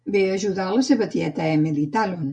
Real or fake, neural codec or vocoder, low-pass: real; none; 9.9 kHz